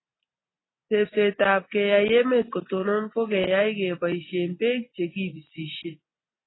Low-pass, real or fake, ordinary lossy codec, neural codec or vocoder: 7.2 kHz; real; AAC, 16 kbps; none